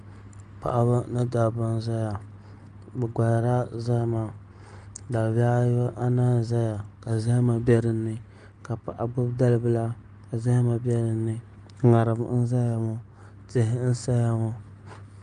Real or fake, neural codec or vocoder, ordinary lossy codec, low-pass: real; none; Opus, 32 kbps; 9.9 kHz